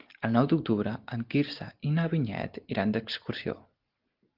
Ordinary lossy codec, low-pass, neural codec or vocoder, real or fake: Opus, 32 kbps; 5.4 kHz; none; real